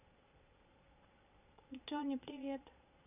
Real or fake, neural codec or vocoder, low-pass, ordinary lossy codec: fake; vocoder, 22.05 kHz, 80 mel bands, Vocos; 3.6 kHz; none